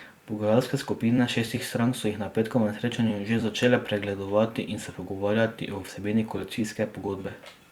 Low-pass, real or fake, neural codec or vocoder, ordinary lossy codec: 19.8 kHz; fake; vocoder, 44.1 kHz, 128 mel bands every 256 samples, BigVGAN v2; Opus, 64 kbps